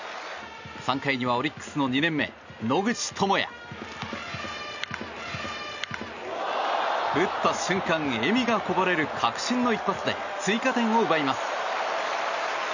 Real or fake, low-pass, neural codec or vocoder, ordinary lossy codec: real; 7.2 kHz; none; none